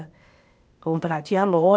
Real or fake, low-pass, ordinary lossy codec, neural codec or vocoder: fake; none; none; codec, 16 kHz, 0.8 kbps, ZipCodec